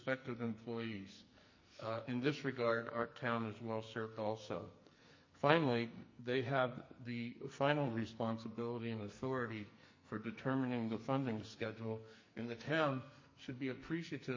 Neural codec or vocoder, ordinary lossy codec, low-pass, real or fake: codec, 44.1 kHz, 2.6 kbps, SNAC; MP3, 32 kbps; 7.2 kHz; fake